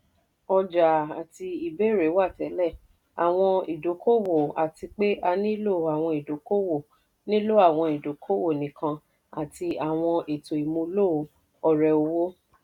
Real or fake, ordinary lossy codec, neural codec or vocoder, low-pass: real; none; none; 19.8 kHz